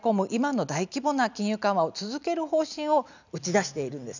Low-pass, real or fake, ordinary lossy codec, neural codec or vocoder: 7.2 kHz; real; none; none